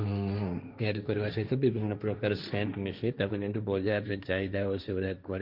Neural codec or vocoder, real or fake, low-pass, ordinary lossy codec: codec, 16 kHz, 1.1 kbps, Voila-Tokenizer; fake; 5.4 kHz; Opus, 64 kbps